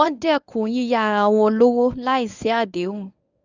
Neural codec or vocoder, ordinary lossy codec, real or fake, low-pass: codec, 24 kHz, 0.9 kbps, WavTokenizer, medium speech release version 1; none; fake; 7.2 kHz